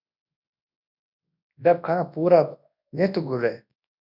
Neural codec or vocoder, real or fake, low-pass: codec, 24 kHz, 0.9 kbps, WavTokenizer, large speech release; fake; 5.4 kHz